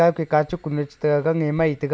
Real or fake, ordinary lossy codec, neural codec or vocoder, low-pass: real; none; none; none